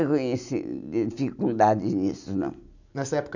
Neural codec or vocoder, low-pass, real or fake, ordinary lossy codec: none; 7.2 kHz; real; none